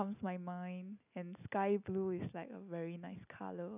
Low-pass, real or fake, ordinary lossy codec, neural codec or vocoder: 3.6 kHz; real; none; none